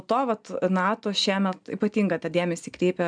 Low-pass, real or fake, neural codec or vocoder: 9.9 kHz; real; none